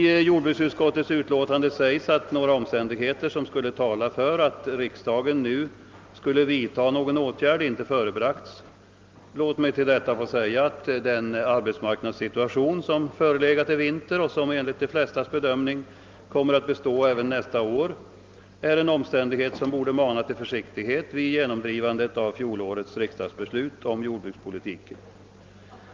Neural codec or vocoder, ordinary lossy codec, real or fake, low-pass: none; Opus, 32 kbps; real; 7.2 kHz